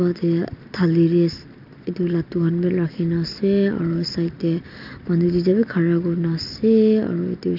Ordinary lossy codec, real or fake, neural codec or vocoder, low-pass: none; real; none; 5.4 kHz